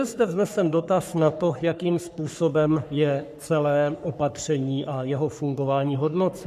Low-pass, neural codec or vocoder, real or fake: 14.4 kHz; codec, 44.1 kHz, 3.4 kbps, Pupu-Codec; fake